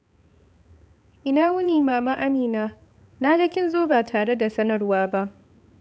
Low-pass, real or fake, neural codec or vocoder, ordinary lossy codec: none; fake; codec, 16 kHz, 4 kbps, X-Codec, HuBERT features, trained on balanced general audio; none